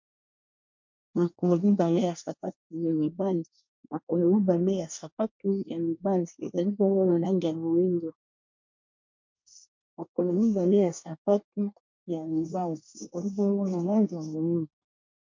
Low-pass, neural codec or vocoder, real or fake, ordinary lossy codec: 7.2 kHz; codec, 24 kHz, 1 kbps, SNAC; fake; MP3, 48 kbps